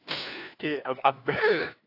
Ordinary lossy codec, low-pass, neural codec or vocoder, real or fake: AAC, 32 kbps; 5.4 kHz; codec, 16 kHz, 1 kbps, FunCodec, trained on LibriTTS, 50 frames a second; fake